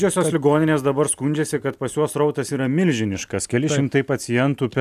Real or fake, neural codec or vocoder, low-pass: real; none; 14.4 kHz